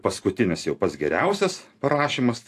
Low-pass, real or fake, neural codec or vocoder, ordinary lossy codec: 14.4 kHz; real; none; AAC, 64 kbps